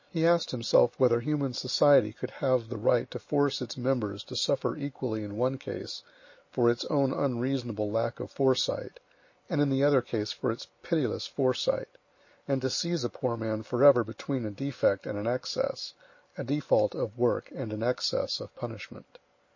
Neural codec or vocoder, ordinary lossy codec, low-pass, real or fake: none; MP3, 32 kbps; 7.2 kHz; real